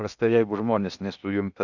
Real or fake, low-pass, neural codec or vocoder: fake; 7.2 kHz; codec, 16 kHz in and 24 kHz out, 0.8 kbps, FocalCodec, streaming, 65536 codes